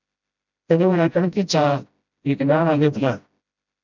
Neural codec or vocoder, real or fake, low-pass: codec, 16 kHz, 0.5 kbps, FreqCodec, smaller model; fake; 7.2 kHz